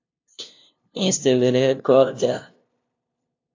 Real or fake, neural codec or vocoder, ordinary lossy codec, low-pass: fake; codec, 16 kHz, 0.5 kbps, FunCodec, trained on LibriTTS, 25 frames a second; AAC, 48 kbps; 7.2 kHz